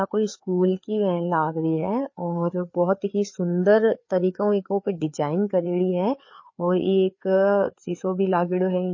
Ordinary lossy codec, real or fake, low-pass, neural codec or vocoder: MP3, 32 kbps; fake; 7.2 kHz; codec, 16 kHz, 4 kbps, FreqCodec, larger model